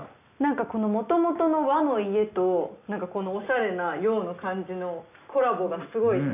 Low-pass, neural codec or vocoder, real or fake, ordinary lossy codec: 3.6 kHz; none; real; none